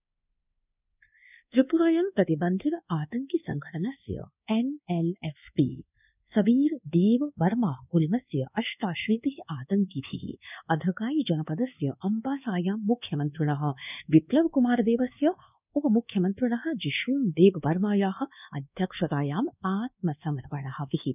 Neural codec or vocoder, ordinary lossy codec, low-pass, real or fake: codec, 24 kHz, 1.2 kbps, DualCodec; none; 3.6 kHz; fake